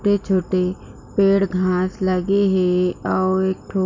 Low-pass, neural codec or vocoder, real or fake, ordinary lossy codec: 7.2 kHz; none; real; MP3, 64 kbps